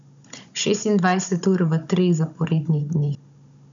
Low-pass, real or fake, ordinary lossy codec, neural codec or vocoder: 7.2 kHz; fake; none; codec, 16 kHz, 16 kbps, FunCodec, trained on Chinese and English, 50 frames a second